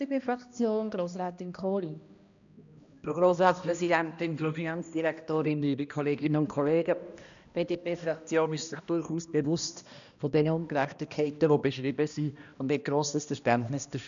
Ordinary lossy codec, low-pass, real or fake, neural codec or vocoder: none; 7.2 kHz; fake; codec, 16 kHz, 1 kbps, X-Codec, HuBERT features, trained on balanced general audio